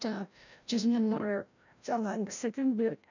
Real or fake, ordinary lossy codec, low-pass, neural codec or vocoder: fake; none; 7.2 kHz; codec, 16 kHz, 0.5 kbps, FreqCodec, larger model